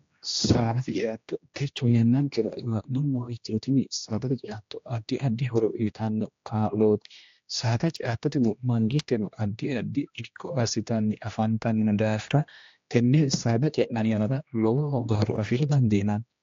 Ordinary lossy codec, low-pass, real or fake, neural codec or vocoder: AAC, 48 kbps; 7.2 kHz; fake; codec, 16 kHz, 1 kbps, X-Codec, HuBERT features, trained on general audio